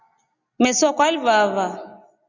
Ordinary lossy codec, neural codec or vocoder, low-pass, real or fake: Opus, 64 kbps; none; 7.2 kHz; real